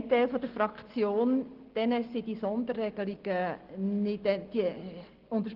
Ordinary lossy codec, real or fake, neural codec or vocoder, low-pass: Opus, 16 kbps; real; none; 5.4 kHz